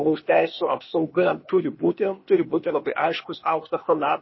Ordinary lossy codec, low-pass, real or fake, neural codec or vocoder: MP3, 24 kbps; 7.2 kHz; fake; codec, 16 kHz, 0.8 kbps, ZipCodec